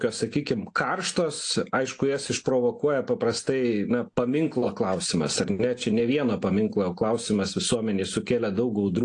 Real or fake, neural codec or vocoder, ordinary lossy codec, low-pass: real; none; AAC, 48 kbps; 9.9 kHz